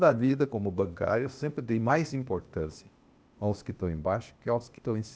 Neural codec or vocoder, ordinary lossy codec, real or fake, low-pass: codec, 16 kHz, 0.8 kbps, ZipCodec; none; fake; none